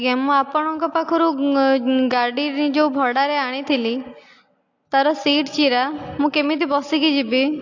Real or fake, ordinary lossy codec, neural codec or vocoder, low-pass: real; AAC, 48 kbps; none; 7.2 kHz